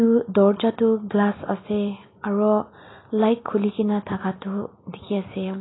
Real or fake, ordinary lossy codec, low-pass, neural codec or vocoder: real; AAC, 16 kbps; 7.2 kHz; none